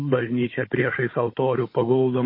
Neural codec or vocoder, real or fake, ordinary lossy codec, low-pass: codec, 16 kHz, 16 kbps, FunCodec, trained on Chinese and English, 50 frames a second; fake; MP3, 24 kbps; 5.4 kHz